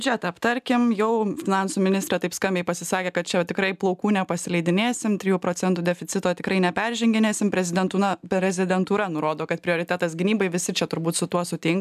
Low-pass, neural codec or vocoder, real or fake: 14.4 kHz; none; real